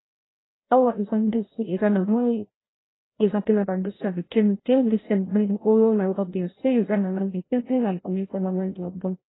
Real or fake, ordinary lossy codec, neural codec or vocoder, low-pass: fake; AAC, 16 kbps; codec, 16 kHz, 0.5 kbps, FreqCodec, larger model; 7.2 kHz